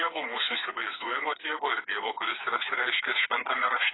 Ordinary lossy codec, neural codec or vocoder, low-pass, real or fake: AAC, 16 kbps; codec, 16 kHz, 4 kbps, FreqCodec, smaller model; 7.2 kHz; fake